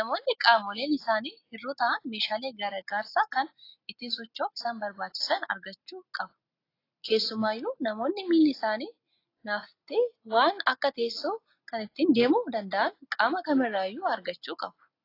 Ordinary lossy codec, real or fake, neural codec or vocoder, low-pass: AAC, 32 kbps; real; none; 5.4 kHz